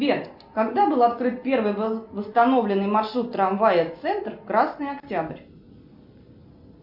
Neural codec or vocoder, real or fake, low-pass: none; real; 5.4 kHz